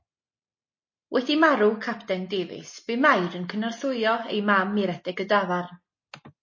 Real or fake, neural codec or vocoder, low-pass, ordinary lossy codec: real; none; 7.2 kHz; MP3, 32 kbps